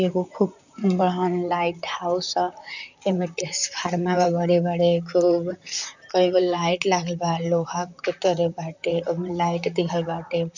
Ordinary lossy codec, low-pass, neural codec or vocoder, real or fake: none; 7.2 kHz; vocoder, 44.1 kHz, 128 mel bands, Pupu-Vocoder; fake